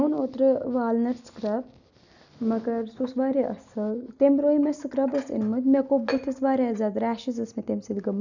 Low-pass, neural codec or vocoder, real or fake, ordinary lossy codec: 7.2 kHz; none; real; none